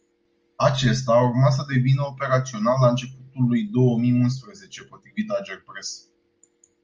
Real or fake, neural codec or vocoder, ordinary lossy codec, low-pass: real; none; Opus, 24 kbps; 7.2 kHz